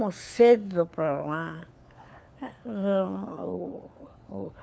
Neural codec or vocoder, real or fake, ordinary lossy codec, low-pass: codec, 16 kHz, 4 kbps, FunCodec, trained on LibriTTS, 50 frames a second; fake; none; none